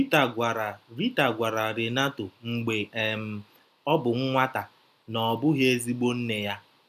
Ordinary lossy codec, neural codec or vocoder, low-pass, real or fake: none; none; 14.4 kHz; real